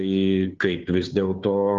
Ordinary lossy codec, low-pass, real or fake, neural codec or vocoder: Opus, 24 kbps; 7.2 kHz; fake; codec, 16 kHz, 2 kbps, FunCodec, trained on Chinese and English, 25 frames a second